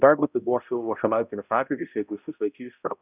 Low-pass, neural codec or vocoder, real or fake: 3.6 kHz; codec, 16 kHz, 0.5 kbps, X-Codec, HuBERT features, trained on balanced general audio; fake